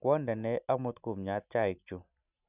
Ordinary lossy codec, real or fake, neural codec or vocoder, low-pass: none; real; none; 3.6 kHz